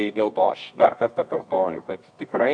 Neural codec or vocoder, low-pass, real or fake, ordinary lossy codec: codec, 24 kHz, 0.9 kbps, WavTokenizer, medium music audio release; 9.9 kHz; fake; MP3, 64 kbps